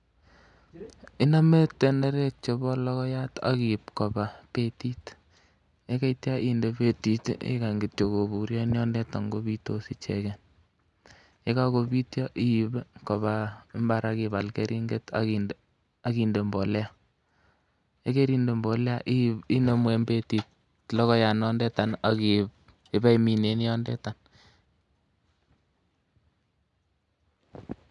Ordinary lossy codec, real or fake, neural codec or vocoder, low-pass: none; real; none; 10.8 kHz